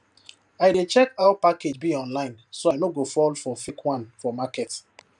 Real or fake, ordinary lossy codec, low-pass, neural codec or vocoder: fake; none; 10.8 kHz; vocoder, 44.1 kHz, 128 mel bands every 512 samples, BigVGAN v2